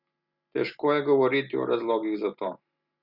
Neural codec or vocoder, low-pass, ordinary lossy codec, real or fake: none; 5.4 kHz; Opus, 64 kbps; real